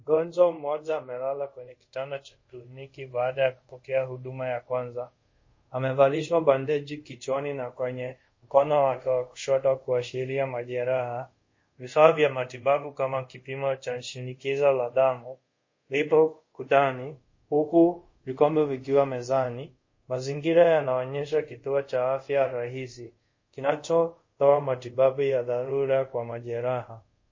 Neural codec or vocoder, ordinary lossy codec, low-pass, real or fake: codec, 24 kHz, 0.5 kbps, DualCodec; MP3, 32 kbps; 7.2 kHz; fake